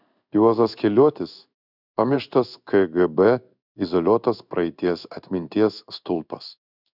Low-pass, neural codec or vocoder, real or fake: 5.4 kHz; codec, 16 kHz in and 24 kHz out, 1 kbps, XY-Tokenizer; fake